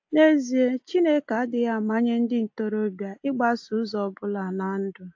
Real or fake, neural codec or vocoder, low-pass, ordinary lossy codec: real; none; 7.2 kHz; AAC, 48 kbps